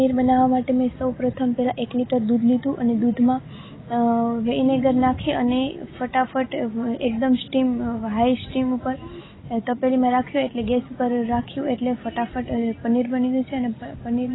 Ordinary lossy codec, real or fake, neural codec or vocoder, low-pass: AAC, 16 kbps; real; none; 7.2 kHz